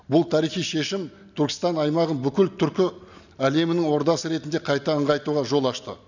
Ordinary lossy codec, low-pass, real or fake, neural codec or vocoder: none; 7.2 kHz; real; none